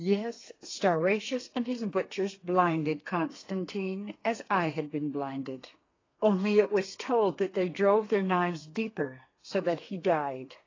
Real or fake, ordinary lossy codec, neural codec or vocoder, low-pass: fake; AAC, 32 kbps; codec, 32 kHz, 1.9 kbps, SNAC; 7.2 kHz